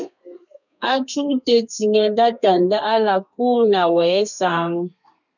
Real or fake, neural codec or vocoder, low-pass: fake; codec, 32 kHz, 1.9 kbps, SNAC; 7.2 kHz